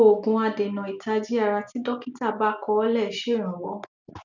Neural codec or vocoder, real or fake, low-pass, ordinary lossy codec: none; real; 7.2 kHz; none